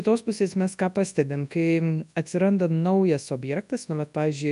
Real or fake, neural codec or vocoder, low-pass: fake; codec, 24 kHz, 0.9 kbps, WavTokenizer, large speech release; 10.8 kHz